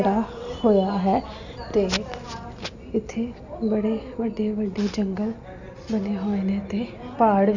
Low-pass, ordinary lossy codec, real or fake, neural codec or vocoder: 7.2 kHz; none; real; none